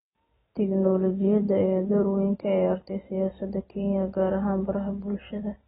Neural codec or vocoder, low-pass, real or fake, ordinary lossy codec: none; 10.8 kHz; real; AAC, 16 kbps